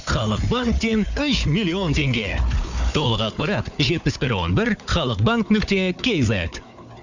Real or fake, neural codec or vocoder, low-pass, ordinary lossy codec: fake; codec, 16 kHz, 4 kbps, FunCodec, trained on Chinese and English, 50 frames a second; 7.2 kHz; none